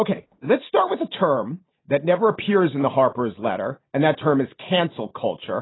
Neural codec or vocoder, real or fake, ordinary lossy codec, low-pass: none; real; AAC, 16 kbps; 7.2 kHz